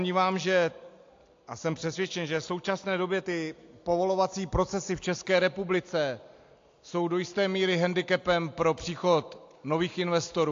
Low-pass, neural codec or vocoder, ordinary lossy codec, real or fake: 7.2 kHz; none; AAC, 48 kbps; real